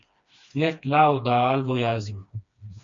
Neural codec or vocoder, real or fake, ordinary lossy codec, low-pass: codec, 16 kHz, 2 kbps, FreqCodec, smaller model; fake; MP3, 48 kbps; 7.2 kHz